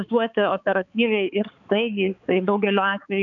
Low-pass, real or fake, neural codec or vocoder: 7.2 kHz; fake; codec, 16 kHz, 4 kbps, X-Codec, HuBERT features, trained on balanced general audio